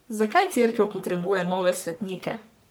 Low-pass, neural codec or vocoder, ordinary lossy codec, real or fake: none; codec, 44.1 kHz, 1.7 kbps, Pupu-Codec; none; fake